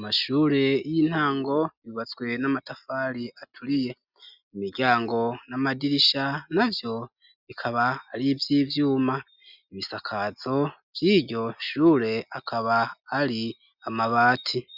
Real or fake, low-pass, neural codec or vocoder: real; 5.4 kHz; none